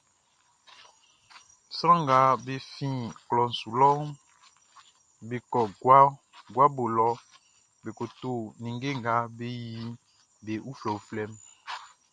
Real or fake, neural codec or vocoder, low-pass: real; none; 9.9 kHz